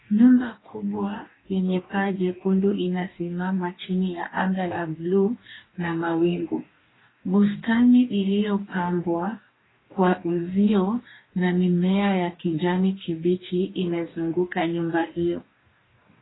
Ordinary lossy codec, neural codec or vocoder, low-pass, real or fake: AAC, 16 kbps; codec, 44.1 kHz, 2.6 kbps, DAC; 7.2 kHz; fake